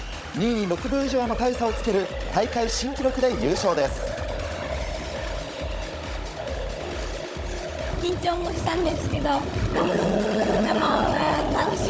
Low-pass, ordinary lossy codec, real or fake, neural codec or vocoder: none; none; fake; codec, 16 kHz, 16 kbps, FunCodec, trained on Chinese and English, 50 frames a second